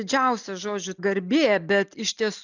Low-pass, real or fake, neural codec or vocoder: 7.2 kHz; real; none